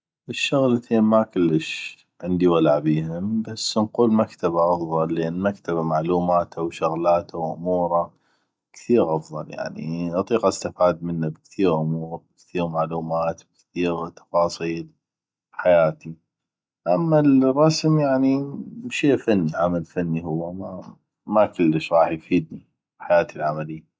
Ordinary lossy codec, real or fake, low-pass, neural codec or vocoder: none; real; none; none